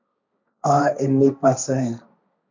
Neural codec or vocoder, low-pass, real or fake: codec, 16 kHz, 1.1 kbps, Voila-Tokenizer; 7.2 kHz; fake